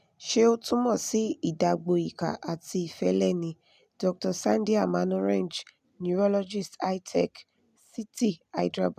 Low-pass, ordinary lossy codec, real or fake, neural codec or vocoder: 14.4 kHz; none; real; none